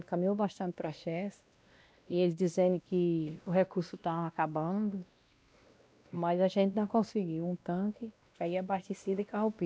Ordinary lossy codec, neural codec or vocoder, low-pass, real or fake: none; codec, 16 kHz, 1 kbps, X-Codec, WavLM features, trained on Multilingual LibriSpeech; none; fake